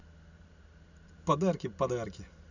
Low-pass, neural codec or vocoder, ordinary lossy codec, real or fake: 7.2 kHz; none; none; real